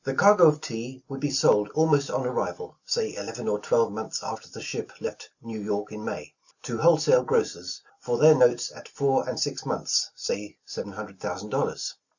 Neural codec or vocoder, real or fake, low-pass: none; real; 7.2 kHz